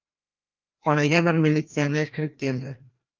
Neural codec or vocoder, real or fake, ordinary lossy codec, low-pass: codec, 16 kHz, 1 kbps, FreqCodec, larger model; fake; Opus, 24 kbps; 7.2 kHz